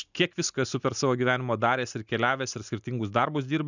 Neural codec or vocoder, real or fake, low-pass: none; real; 7.2 kHz